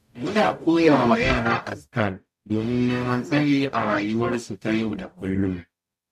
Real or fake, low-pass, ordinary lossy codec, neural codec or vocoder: fake; 14.4 kHz; MP3, 64 kbps; codec, 44.1 kHz, 0.9 kbps, DAC